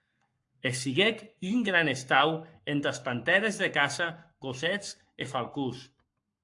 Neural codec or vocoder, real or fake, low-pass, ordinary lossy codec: codec, 44.1 kHz, 7.8 kbps, Pupu-Codec; fake; 10.8 kHz; AAC, 64 kbps